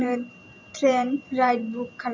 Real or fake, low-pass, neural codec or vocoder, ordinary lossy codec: fake; 7.2 kHz; vocoder, 44.1 kHz, 128 mel bands every 512 samples, BigVGAN v2; MP3, 64 kbps